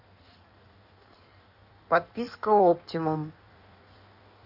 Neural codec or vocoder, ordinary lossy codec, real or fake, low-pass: codec, 16 kHz in and 24 kHz out, 1.1 kbps, FireRedTTS-2 codec; none; fake; 5.4 kHz